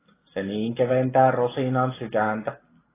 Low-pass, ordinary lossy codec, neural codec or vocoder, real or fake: 3.6 kHz; AAC, 16 kbps; none; real